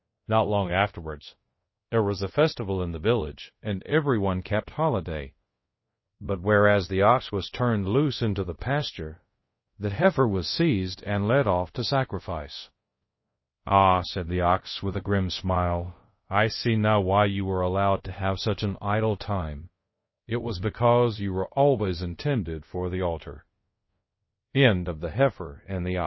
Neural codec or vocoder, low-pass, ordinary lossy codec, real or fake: codec, 24 kHz, 0.5 kbps, DualCodec; 7.2 kHz; MP3, 24 kbps; fake